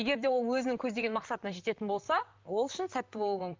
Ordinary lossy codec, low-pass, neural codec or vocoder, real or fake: Opus, 32 kbps; 7.2 kHz; vocoder, 44.1 kHz, 128 mel bands, Pupu-Vocoder; fake